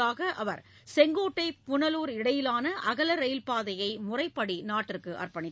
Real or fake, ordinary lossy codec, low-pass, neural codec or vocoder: real; none; none; none